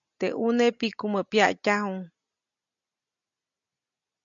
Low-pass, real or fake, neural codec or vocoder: 7.2 kHz; real; none